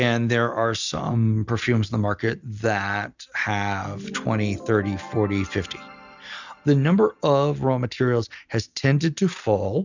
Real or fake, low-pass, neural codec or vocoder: real; 7.2 kHz; none